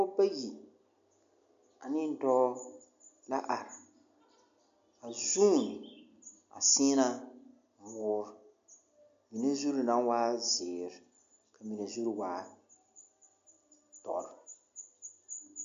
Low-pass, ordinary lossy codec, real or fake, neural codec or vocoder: 7.2 kHz; MP3, 64 kbps; real; none